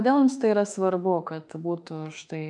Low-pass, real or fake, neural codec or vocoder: 10.8 kHz; fake; autoencoder, 48 kHz, 32 numbers a frame, DAC-VAE, trained on Japanese speech